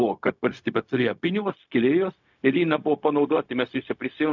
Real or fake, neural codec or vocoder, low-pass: fake; codec, 16 kHz, 0.4 kbps, LongCat-Audio-Codec; 7.2 kHz